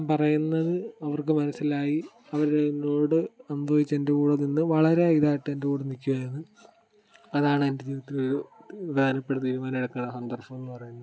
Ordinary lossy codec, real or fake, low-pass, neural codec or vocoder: none; real; none; none